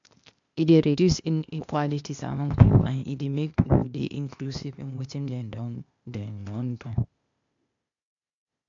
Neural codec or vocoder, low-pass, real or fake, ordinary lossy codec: codec, 16 kHz, 0.8 kbps, ZipCodec; 7.2 kHz; fake; MP3, 64 kbps